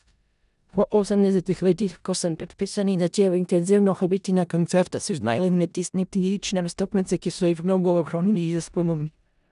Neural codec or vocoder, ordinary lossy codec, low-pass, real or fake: codec, 16 kHz in and 24 kHz out, 0.4 kbps, LongCat-Audio-Codec, four codebook decoder; none; 10.8 kHz; fake